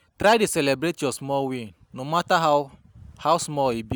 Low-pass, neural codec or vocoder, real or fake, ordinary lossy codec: none; none; real; none